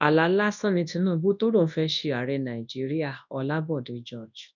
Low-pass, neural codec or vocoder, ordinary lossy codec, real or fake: 7.2 kHz; codec, 24 kHz, 0.9 kbps, WavTokenizer, large speech release; none; fake